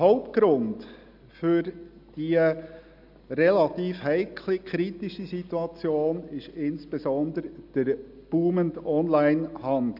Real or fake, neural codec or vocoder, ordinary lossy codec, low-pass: real; none; none; 5.4 kHz